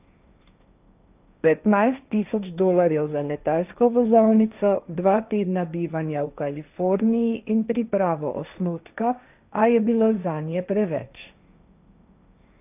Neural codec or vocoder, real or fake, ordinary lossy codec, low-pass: codec, 16 kHz, 1.1 kbps, Voila-Tokenizer; fake; none; 3.6 kHz